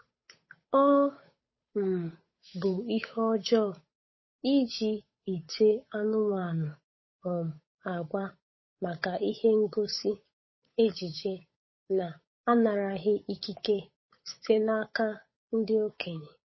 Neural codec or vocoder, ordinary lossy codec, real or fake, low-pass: codec, 16 kHz, 8 kbps, FunCodec, trained on Chinese and English, 25 frames a second; MP3, 24 kbps; fake; 7.2 kHz